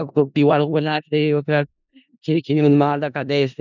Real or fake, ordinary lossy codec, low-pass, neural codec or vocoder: fake; none; 7.2 kHz; codec, 16 kHz in and 24 kHz out, 0.4 kbps, LongCat-Audio-Codec, four codebook decoder